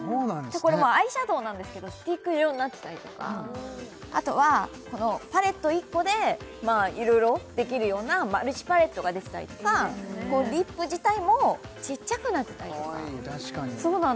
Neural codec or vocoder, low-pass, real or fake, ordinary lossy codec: none; none; real; none